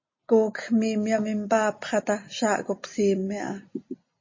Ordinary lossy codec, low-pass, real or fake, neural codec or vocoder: MP3, 32 kbps; 7.2 kHz; real; none